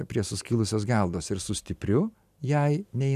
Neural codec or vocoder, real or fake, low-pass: none; real; 14.4 kHz